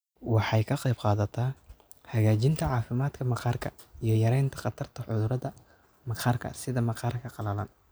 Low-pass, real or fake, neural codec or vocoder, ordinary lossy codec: none; real; none; none